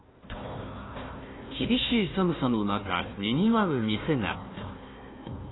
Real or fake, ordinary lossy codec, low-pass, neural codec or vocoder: fake; AAC, 16 kbps; 7.2 kHz; codec, 16 kHz, 1 kbps, FunCodec, trained on Chinese and English, 50 frames a second